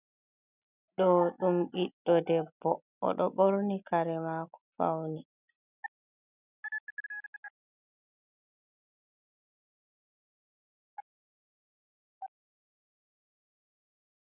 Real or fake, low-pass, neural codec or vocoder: real; 3.6 kHz; none